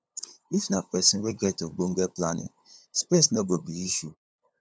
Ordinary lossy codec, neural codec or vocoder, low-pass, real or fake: none; codec, 16 kHz, 8 kbps, FunCodec, trained on LibriTTS, 25 frames a second; none; fake